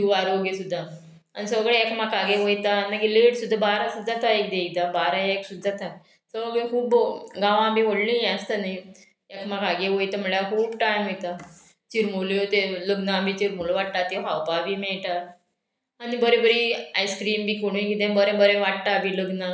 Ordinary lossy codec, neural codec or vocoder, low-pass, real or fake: none; none; none; real